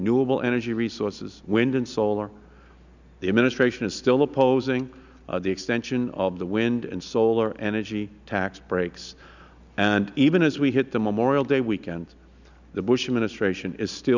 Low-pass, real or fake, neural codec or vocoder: 7.2 kHz; real; none